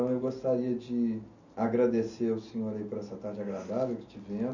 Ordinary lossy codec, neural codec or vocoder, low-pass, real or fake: none; none; 7.2 kHz; real